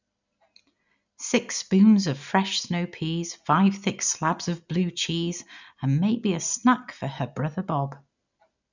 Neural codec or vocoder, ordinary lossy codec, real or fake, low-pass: none; none; real; 7.2 kHz